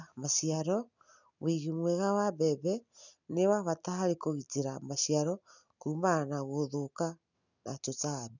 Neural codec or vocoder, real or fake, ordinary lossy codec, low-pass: none; real; none; 7.2 kHz